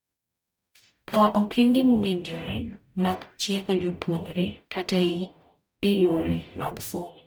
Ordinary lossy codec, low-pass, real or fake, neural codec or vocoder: none; none; fake; codec, 44.1 kHz, 0.9 kbps, DAC